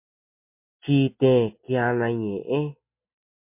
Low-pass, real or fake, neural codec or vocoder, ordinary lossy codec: 3.6 kHz; real; none; MP3, 32 kbps